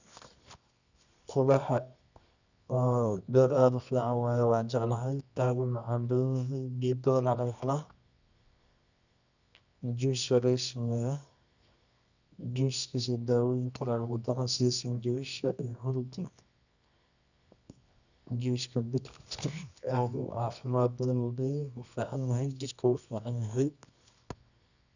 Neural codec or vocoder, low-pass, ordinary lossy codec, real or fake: codec, 24 kHz, 0.9 kbps, WavTokenizer, medium music audio release; 7.2 kHz; none; fake